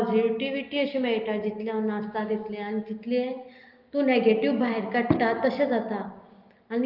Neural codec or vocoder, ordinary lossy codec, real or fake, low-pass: none; Opus, 24 kbps; real; 5.4 kHz